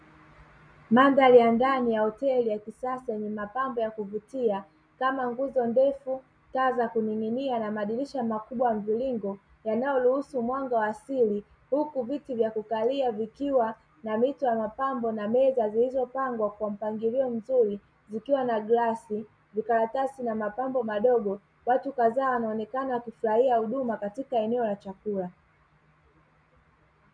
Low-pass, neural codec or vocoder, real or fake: 9.9 kHz; none; real